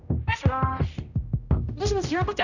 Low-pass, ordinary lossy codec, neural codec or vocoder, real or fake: 7.2 kHz; none; codec, 16 kHz, 0.5 kbps, X-Codec, HuBERT features, trained on general audio; fake